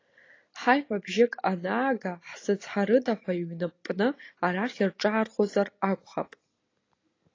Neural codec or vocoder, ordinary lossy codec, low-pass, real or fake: none; AAC, 32 kbps; 7.2 kHz; real